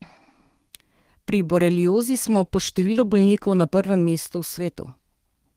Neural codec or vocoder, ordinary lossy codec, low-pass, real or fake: codec, 32 kHz, 1.9 kbps, SNAC; Opus, 24 kbps; 14.4 kHz; fake